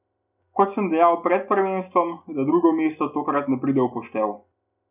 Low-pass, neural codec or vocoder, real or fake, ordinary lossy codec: 3.6 kHz; none; real; none